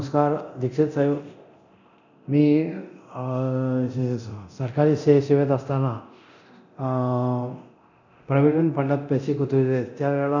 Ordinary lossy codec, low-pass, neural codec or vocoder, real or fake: none; 7.2 kHz; codec, 24 kHz, 0.9 kbps, DualCodec; fake